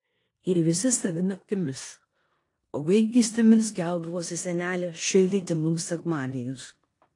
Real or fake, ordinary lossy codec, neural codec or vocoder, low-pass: fake; AAC, 48 kbps; codec, 16 kHz in and 24 kHz out, 0.9 kbps, LongCat-Audio-Codec, four codebook decoder; 10.8 kHz